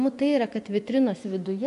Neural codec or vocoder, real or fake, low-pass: vocoder, 24 kHz, 100 mel bands, Vocos; fake; 10.8 kHz